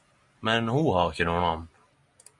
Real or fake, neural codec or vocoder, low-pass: real; none; 10.8 kHz